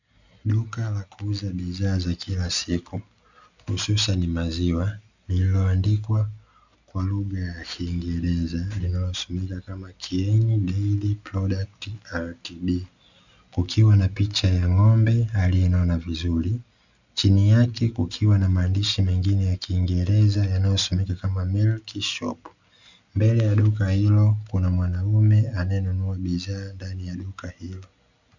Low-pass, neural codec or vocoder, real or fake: 7.2 kHz; none; real